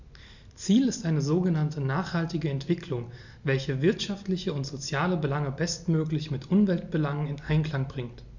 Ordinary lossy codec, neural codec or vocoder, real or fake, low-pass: none; none; real; 7.2 kHz